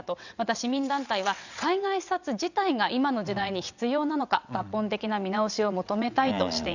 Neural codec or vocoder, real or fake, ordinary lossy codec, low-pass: vocoder, 22.05 kHz, 80 mel bands, WaveNeXt; fake; none; 7.2 kHz